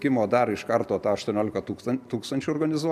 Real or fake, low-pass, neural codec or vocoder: real; 14.4 kHz; none